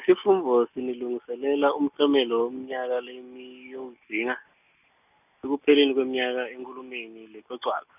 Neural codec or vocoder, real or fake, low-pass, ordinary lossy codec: none; real; 3.6 kHz; none